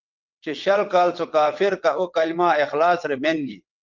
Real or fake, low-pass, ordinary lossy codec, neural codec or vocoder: fake; 7.2 kHz; Opus, 32 kbps; vocoder, 22.05 kHz, 80 mel bands, WaveNeXt